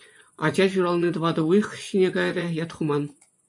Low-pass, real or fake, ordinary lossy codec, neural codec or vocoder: 10.8 kHz; fake; MP3, 64 kbps; vocoder, 24 kHz, 100 mel bands, Vocos